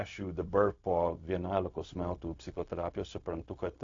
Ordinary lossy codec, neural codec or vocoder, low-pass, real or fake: AAC, 48 kbps; codec, 16 kHz, 0.4 kbps, LongCat-Audio-Codec; 7.2 kHz; fake